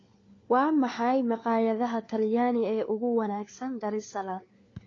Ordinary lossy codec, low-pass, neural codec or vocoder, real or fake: AAC, 32 kbps; 7.2 kHz; codec, 16 kHz, 4 kbps, FunCodec, trained on LibriTTS, 50 frames a second; fake